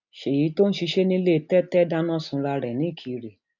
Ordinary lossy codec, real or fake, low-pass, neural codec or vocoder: none; real; 7.2 kHz; none